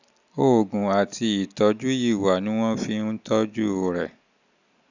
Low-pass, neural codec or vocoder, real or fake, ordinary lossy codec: 7.2 kHz; none; real; none